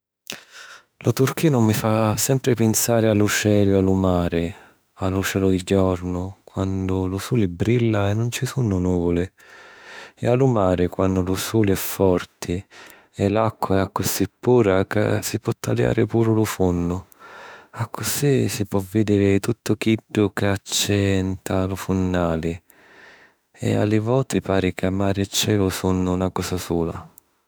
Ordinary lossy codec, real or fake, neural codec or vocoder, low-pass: none; fake; autoencoder, 48 kHz, 32 numbers a frame, DAC-VAE, trained on Japanese speech; none